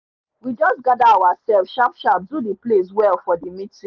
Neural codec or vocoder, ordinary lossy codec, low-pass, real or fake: none; Opus, 32 kbps; 7.2 kHz; real